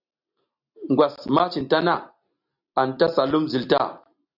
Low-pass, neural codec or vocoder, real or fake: 5.4 kHz; none; real